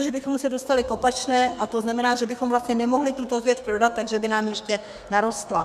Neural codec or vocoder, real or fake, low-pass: codec, 32 kHz, 1.9 kbps, SNAC; fake; 14.4 kHz